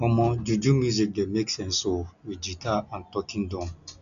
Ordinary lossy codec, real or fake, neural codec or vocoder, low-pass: none; real; none; 7.2 kHz